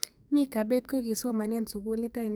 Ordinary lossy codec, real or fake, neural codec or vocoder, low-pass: none; fake; codec, 44.1 kHz, 2.6 kbps, SNAC; none